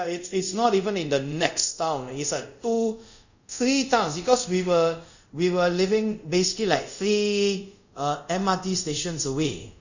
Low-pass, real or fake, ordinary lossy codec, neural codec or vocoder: 7.2 kHz; fake; none; codec, 24 kHz, 0.5 kbps, DualCodec